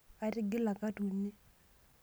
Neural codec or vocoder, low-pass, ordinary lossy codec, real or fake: none; none; none; real